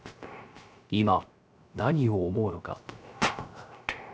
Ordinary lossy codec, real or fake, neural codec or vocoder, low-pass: none; fake; codec, 16 kHz, 0.3 kbps, FocalCodec; none